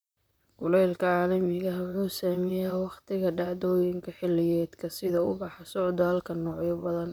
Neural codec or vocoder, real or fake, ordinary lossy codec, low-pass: vocoder, 44.1 kHz, 128 mel bands, Pupu-Vocoder; fake; none; none